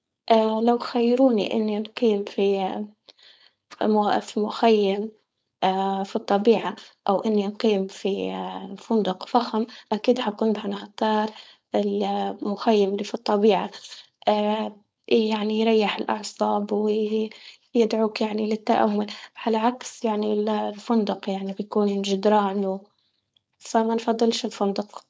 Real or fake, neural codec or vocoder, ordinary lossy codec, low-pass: fake; codec, 16 kHz, 4.8 kbps, FACodec; none; none